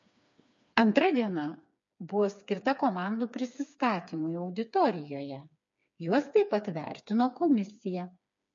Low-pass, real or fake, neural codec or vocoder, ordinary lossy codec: 7.2 kHz; fake; codec, 16 kHz, 4 kbps, FreqCodec, smaller model; MP3, 48 kbps